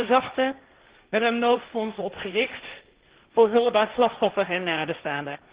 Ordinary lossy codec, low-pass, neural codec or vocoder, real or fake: Opus, 16 kbps; 3.6 kHz; codec, 16 kHz, 1.1 kbps, Voila-Tokenizer; fake